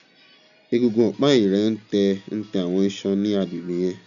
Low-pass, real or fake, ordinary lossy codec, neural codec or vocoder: 7.2 kHz; real; none; none